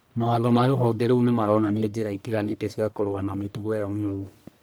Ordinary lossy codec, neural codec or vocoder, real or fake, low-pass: none; codec, 44.1 kHz, 1.7 kbps, Pupu-Codec; fake; none